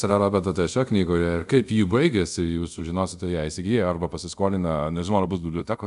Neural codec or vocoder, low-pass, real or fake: codec, 24 kHz, 0.5 kbps, DualCodec; 10.8 kHz; fake